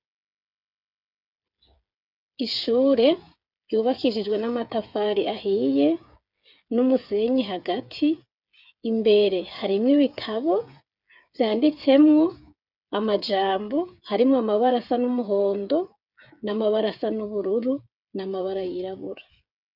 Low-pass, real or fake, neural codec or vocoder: 5.4 kHz; fake; codec, 16 kHz, 8 kbps, FreqCodec, smaller model